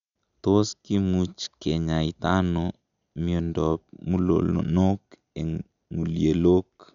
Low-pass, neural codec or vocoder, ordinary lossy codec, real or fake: 7.2 kHz; none; none; real